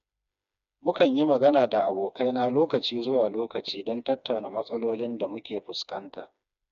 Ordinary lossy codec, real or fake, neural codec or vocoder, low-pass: none; fake; codec, 16 kHz, 2 kbps, FreqCodec, smaller model; 7.2 kHz